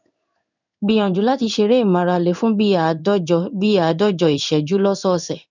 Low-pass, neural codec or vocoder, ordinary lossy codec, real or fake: 7.2 kHz; codec, 16 kHz in and 24 kHz out, 1 kbps, XY-Tokenizer; none; fake